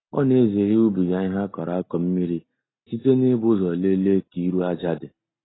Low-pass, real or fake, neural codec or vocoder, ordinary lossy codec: 7.2 kHz; real; none; AAC, 16 kbps